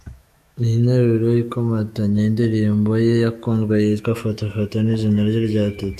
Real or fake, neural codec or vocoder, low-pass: fake; autoencoder, 48 kHz, 128 numbers a frame, DAC-VAE, trained on Japanese speech; 14.4 kHz